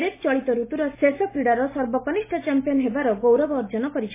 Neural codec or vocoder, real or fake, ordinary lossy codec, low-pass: none; real; MP3, 16 kbps; 3.6 kHz